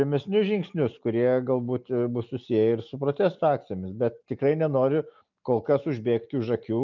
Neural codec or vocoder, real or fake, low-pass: none; real; 7.2 kHz